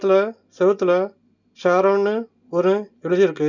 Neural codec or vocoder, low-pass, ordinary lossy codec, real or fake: none; 7.2 kHz; none; real